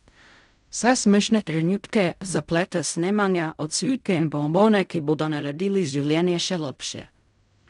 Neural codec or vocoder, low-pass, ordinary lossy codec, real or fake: codec, 16 kHz in and 24 kHz out, 0.4 kbps, LongCat-Audio-Codec, fine tuned four codebook decoder; 10.8 kHz; none; fake